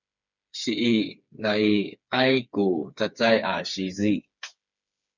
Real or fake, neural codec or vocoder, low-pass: fake; codec, 16 kHz, 4 kbps, FreqCodec, smaller model; 7.2 kHz